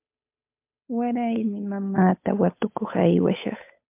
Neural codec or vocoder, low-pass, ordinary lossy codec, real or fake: codec, 16 kHz, 8 kbps, FunCodec, trained on Chinese and English, 25 frames a second; 3.6 kHz; MP3, 32 kbps; fake